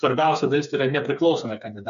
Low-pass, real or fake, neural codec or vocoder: 7.2 kHz; fake; codec, 16 kHz, 4 kbps, FreqCodec, smaller model